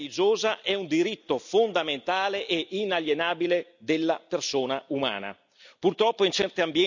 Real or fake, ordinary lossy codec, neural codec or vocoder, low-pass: real; none; none; 7.2 kHz